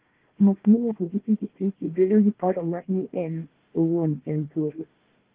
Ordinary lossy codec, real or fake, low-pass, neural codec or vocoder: Opus, 16 kbps; fake; 3.6 kHz; codec, 16 kHz, 1 kbps, FunCodec, trained on Chinese and English, 50 frames a second